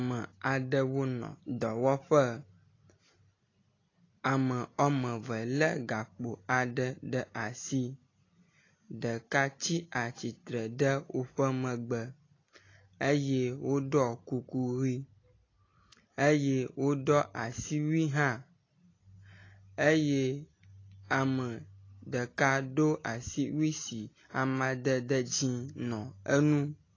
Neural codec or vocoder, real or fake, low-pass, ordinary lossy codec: none; real; 7.2 kHz; AAC, 32 kbps